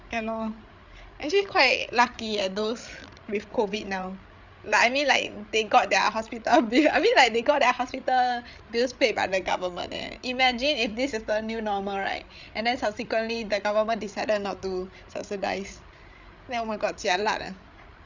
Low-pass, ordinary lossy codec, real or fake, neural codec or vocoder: 7.2 kHz; Opus, 64 kbps; fake; codec, 16 kHz, 8 kbps, FreqCodec, larger model